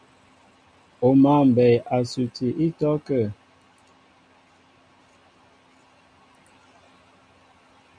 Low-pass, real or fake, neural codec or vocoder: 9.9 kHz; real; none